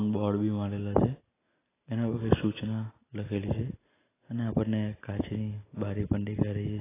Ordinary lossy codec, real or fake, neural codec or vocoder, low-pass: AAC, 16 kbps; real; none; 3.6 kHz